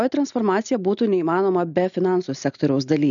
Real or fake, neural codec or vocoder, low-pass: real; none; 7.2 kHz